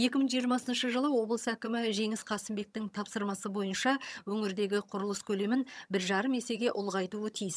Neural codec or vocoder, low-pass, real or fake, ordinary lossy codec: vocoder, 22.05 kHz, 80 mel bands, HiFi-GAN; none; fake; none